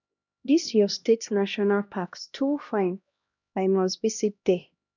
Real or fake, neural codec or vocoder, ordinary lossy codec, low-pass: fake; codec, 16 kHz, 1 kbps, X-Codec, HuBERT features, trained on LibriSpeech; none; 7.2 kHz